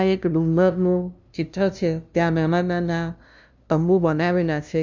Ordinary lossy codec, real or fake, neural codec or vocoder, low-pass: none; fake; codec, 16 kHz, 0.5 kbps, FunCodec, trained on LibriTTS, 25 frames a second; none